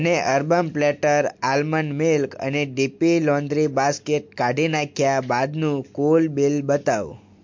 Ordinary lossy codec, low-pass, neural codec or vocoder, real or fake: MP3, 48 kbps; 7.2 kHz; none; real